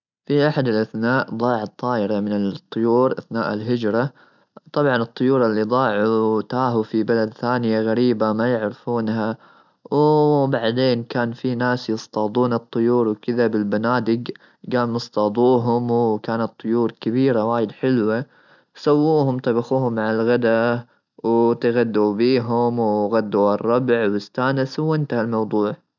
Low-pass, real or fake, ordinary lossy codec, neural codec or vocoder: 7.2 kHz; real; none; none